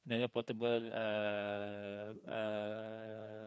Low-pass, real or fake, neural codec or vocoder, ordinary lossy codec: none; fake; codec, 16 kHz, 2 kbps, FreqCodec, larger model; none